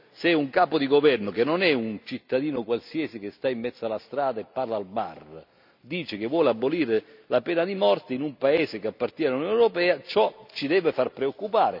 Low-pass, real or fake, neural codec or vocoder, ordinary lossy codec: 5.4 kHz; real; none; none